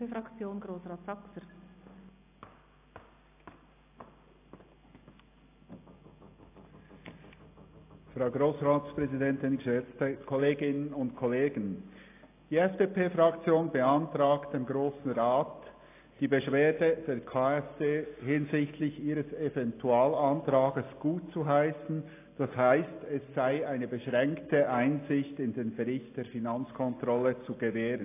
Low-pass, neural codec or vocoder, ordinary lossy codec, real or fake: 3.6 kHz; none; AAC, 24 kbps; real